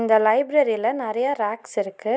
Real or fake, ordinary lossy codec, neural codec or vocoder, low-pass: real; none; none; none